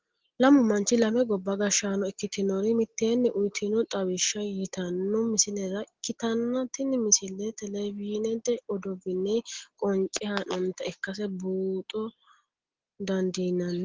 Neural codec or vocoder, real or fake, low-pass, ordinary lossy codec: none; real; 7.2 kHz; Opus, 16 kbps